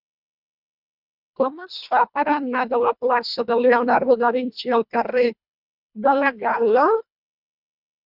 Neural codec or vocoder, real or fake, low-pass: codec, 24 kHz, 1.5 kbps, HILCodec; fake; 5.4 kHz